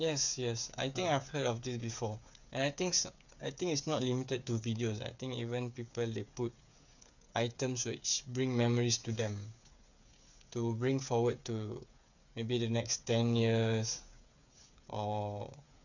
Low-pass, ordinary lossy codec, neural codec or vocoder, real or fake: 7.2 kHz; none; codec, 16 kHz, 8 kbps, FreqCodec, smaller model; fake